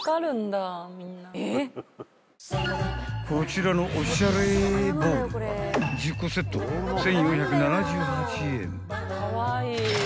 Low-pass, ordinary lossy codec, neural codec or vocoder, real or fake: none; none; none; real